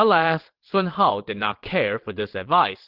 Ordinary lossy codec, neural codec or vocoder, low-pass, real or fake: Opus, 16 kbps; vocoder, 22.05 kHz, 80 mel bands, WaveNeXt; 5.4 kHz; fake